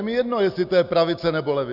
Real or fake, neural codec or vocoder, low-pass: real; none; 5.4 kHz